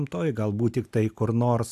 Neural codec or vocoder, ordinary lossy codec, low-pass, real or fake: none; AAC, 96 kbps; 14.4 kHz; real